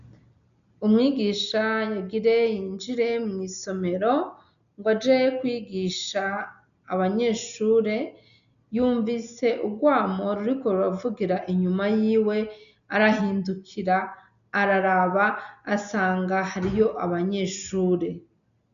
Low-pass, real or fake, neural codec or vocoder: 7.2 kHz; real; none